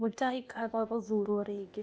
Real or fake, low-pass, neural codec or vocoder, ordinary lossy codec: fake; none; codec, 16 kHz, 0.8 kbps, ZipCodec; none